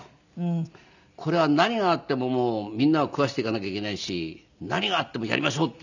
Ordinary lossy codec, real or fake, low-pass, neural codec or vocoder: none; real; 7.2 kHz; none